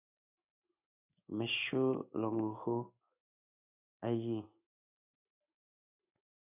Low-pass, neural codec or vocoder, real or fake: 3.6 kHz; codec, 16 kHz in and 24 kHz out, 1 kbps, XY-Tokenizer; fake